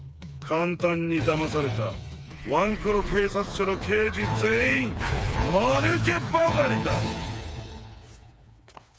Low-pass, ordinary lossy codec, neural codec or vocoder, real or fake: none; none; codec, 16 kHz, 4 kbps, FreqCodec, smaller model; fake